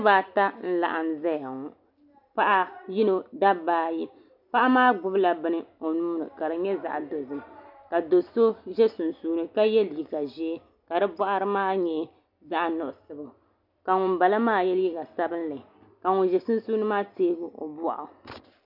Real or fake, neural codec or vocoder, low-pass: real; none; 5.4 kHz